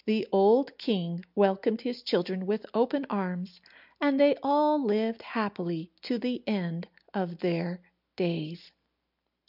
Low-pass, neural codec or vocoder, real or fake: 5.4 kHz; none; real